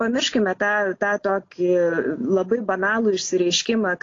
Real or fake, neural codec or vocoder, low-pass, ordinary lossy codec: real; none; 7.2 kHz; AAC, 32 kbps